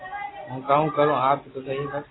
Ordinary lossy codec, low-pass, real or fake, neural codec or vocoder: AAC, 16 kbps; 7.2 kHz; real; none